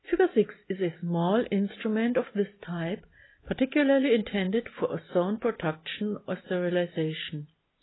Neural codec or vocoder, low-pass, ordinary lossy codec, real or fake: none; 7.2 kHz; AAC, 16 kbps; real